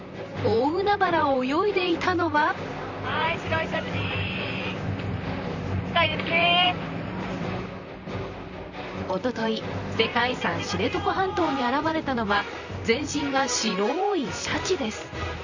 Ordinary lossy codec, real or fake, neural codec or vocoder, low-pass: Opus, 64 kbps; fake; vocoder, 44.1 kHz, 128 mel bands, Pupu-Vocoder; 7.2 kHz